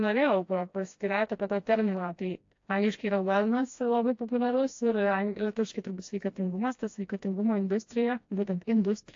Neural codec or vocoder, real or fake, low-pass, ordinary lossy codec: codec, 16 kHz, 1 kbps, FreqCodec, smaller model; fake; 7.2 kHz; AAC, 48 kbps